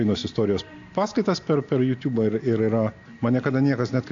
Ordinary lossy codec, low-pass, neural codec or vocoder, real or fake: AAC, 48 kbps; 7.2 kHz; none; real